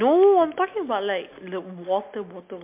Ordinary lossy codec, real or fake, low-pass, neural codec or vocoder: MP3, 32 kbps; real; 3.6 kHz; none